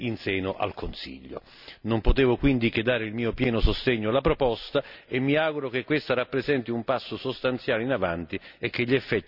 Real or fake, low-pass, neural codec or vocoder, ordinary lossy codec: real; 5.4 kHz; none; none